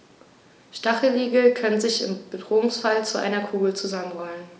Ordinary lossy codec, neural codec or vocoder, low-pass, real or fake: none; none; none; real